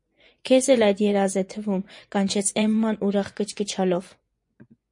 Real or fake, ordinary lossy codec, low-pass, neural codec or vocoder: fake; MP3, 48 kbps; 10.8 kHz; vocoder, 24 kHz, 100 mel bands, Vocos